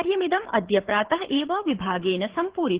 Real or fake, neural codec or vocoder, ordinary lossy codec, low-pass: fake; codec, 24 kHz, 6 kbps, HILCodec; Opus, 16 kbps; 3.6 kHz